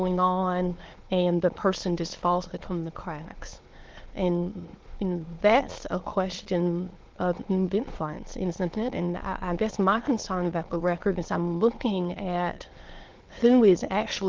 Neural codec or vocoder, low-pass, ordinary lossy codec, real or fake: autoencoder, 22.05 kHz, a latent of 192 numbers a frame, VITS, trained on many speakers; 7.2 kHz; Opus, 16 kbps; fake